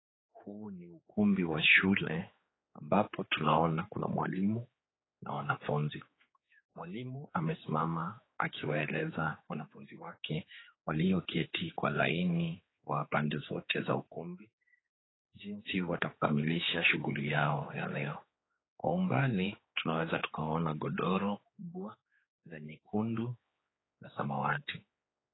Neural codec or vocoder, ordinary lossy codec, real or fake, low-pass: codec, 16 kHz, 4 kbps, X-Codec, HuBERT features, trained on general audio; AAC, 16 kbps; fake; 7.2 kHz